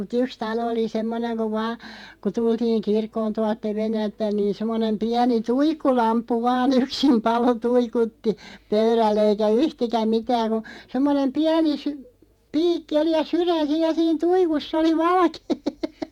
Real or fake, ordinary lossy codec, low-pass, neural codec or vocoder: fake; none; 19.8 kHz; vocoder, 48 kHz, 128 mel bands, Vocos